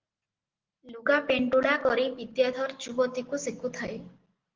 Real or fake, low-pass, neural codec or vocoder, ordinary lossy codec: real; 7.2 kHz; none; Opus, 16 kbps